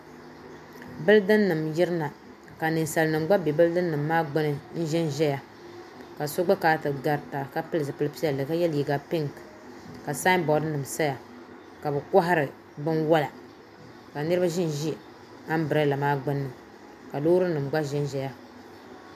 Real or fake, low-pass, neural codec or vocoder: real; 14.4 kHz; none